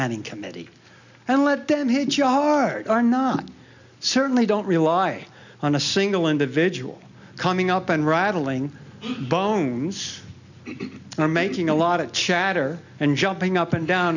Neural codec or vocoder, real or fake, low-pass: none; real; 7.2 kHz